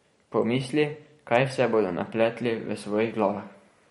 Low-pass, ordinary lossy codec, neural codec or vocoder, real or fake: 19.8 kHz; MP3, 48 kbps; vocoder, 44.1 kHz, 128 mel bands every 512 samples, BigVGAN v2; fake